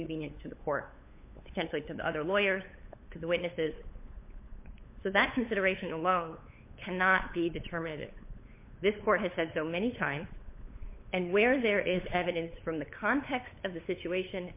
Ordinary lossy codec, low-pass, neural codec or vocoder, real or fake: MP3, 24 kbps; 3.6 kHz; codec, 16 kHz, 8 kbps, FunCodec, trained on LibriTTS, 25 frames a second; fake